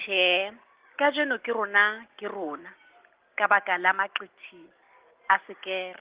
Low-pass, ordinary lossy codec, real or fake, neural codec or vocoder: 3.6 kHz; Opus, 16 kbps; real; none